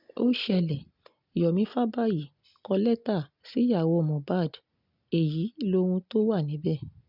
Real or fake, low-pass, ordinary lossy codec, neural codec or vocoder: real; 5.4 kHz; none; none